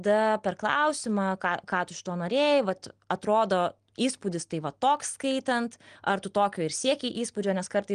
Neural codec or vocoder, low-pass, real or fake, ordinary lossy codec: none; 9.9 kHz; real; Opus, 24 kbps